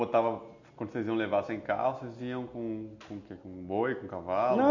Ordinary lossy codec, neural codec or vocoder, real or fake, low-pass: none; none; real; 7.2 kHz